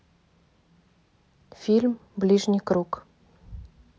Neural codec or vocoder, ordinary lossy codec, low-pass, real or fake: none; none; none; real